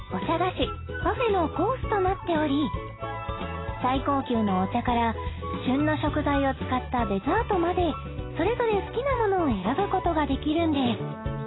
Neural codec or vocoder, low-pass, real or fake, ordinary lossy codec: none; 7.2 kHz; real; AAC, 16 kbps